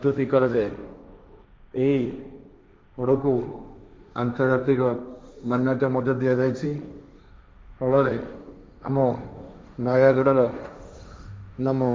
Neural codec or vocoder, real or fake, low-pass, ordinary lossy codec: codec, 16 kHz, 1.1 kbps, Voila-Tokenizer; fake; none; none